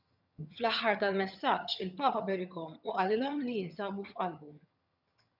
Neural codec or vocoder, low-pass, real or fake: vocoder, 22.05 kHz, 80 mel bands, HiFi-GAN; 5.4 kHz; fake